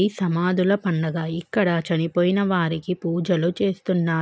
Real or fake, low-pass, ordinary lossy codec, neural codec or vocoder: real; none; none; none